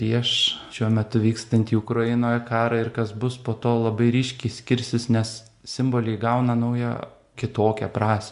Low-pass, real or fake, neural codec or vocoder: 10.8 kHz; real; none